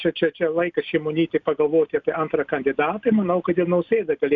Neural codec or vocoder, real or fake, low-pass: none; real; 7.2 kHz